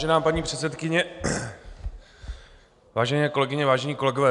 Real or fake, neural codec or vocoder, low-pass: real; none; 10.8 kHz